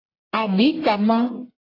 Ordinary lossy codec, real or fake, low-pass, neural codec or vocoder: AAC, 24 kbps; fake; 5.4 kHz; codec, 44.1 kHz, 1.7 kbps, Pupu-Codec